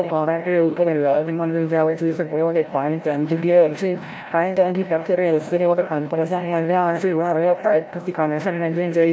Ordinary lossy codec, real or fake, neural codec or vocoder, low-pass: none; fake; codec, 16 kHz, 0.5 kbps, FreqCodec, larger model; none